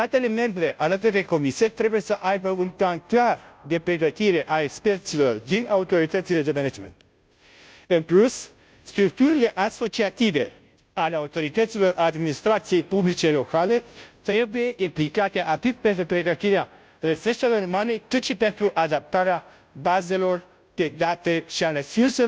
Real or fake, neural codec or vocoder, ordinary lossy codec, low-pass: fake; codec, 16 kHz, 0.5 kbps, FunCodec, trained on Chinese and English, 25 frames a second; none; none